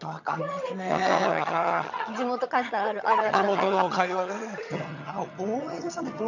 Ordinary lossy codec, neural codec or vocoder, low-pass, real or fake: none; vocoder, 22.05 kHz, 80 mel bands, HiFi-GAN; 7.2 kHz; fake